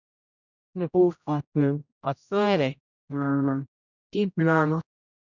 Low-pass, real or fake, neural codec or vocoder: 7.2 kHz; fake; codec, 16 kHz, 0.5 kbps, X-Codec, HuBERT features, trained on general audio